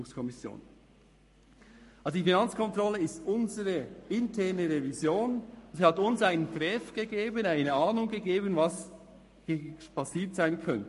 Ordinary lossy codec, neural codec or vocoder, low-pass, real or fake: MP3, 48 kbps; codec, 44.1 kHz, 7.8 kbps, Pupu-Codec; 14.4 kHz; fake